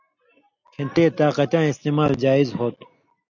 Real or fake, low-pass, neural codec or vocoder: real; 7.2 kHz; none